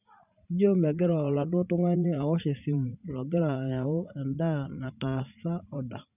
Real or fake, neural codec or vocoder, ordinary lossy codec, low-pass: fake; vocoder, 44.1 kHz, 80 mel bands, Vocos; none; 3.6 kHz